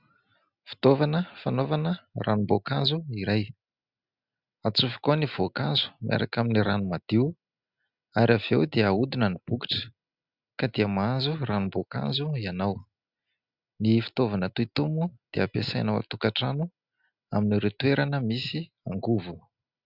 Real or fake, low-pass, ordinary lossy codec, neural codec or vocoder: real; 5.4 kHz; Opus, 64 kbps; none